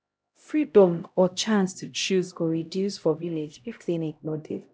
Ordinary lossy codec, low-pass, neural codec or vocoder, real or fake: none; none; codec, 16 kHz, 0.5 kbps, X-Codec, HuBERT features, trained on LibriSpeech; fake